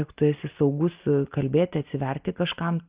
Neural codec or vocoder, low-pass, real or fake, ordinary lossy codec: none; 3.6 kHz; real; Opus, 32 kbps